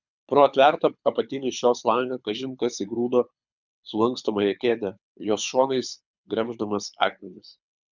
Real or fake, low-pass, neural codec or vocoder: fake; 7.2 kHz; codec, 24 kHz, 6 kbps, HILCodec